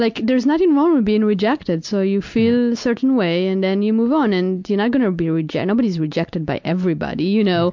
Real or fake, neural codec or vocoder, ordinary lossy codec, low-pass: real; none; MP3, 64 kbps; 7.2 kHz